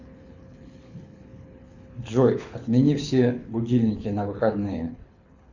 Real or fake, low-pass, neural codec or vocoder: fake; 7.2 kHz; codec, 24 kHz, 6 kbps, HILCodec